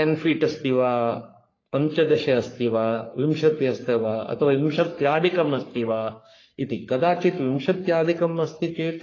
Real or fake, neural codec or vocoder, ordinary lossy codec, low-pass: fake; codec, 44.1 kHz, 3.4 kbps, Pupu-Codec; AAC, 32 kbps; 7.2 kHz